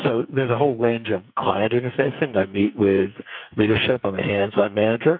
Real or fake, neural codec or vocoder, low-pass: fake; codec, 44.1 kHz, 2.6 kbps, SNAC; 5.4 kHz